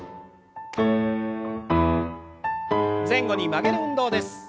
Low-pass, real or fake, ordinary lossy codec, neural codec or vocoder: none; real; none; none